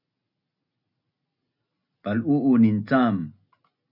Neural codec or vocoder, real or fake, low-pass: none; real; 5.4 kHz